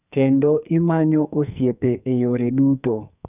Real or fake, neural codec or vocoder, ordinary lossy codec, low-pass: fake; codec, 44.1 kHz, 2.6 kbps, SNAC; none; 3.6 kHz